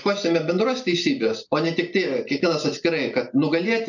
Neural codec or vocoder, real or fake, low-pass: none; real; 7.2 kHz